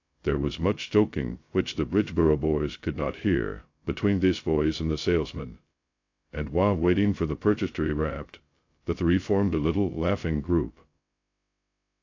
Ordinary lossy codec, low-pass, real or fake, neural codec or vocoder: AAC, 48 kbps; 7.2 kHz; fake; codec, 16 kHz, 0.2 kbps, FocalCodec